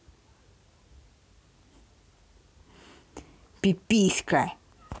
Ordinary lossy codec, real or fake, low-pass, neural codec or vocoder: none; real; none; none